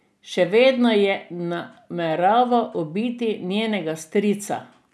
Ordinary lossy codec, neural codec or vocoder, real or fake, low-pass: none; none; real; none